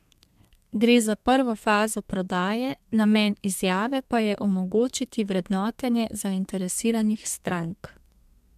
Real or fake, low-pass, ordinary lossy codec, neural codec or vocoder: fake; 14.4 kHz; MP3, 96 kbps; codec, 32 kHz, 1.9 kbps, SNAC